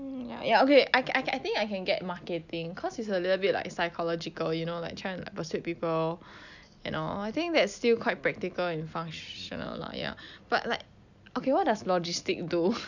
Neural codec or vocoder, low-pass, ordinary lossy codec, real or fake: none; 7.2 kHz; none; real